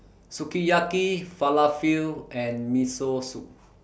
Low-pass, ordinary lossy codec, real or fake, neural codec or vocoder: none; none; real; none